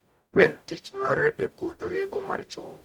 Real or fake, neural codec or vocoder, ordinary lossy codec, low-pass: fake; codec, 44.1 kHz, 0.9 kbps, DAC; MP3, 96 kbps; 19.8 kHz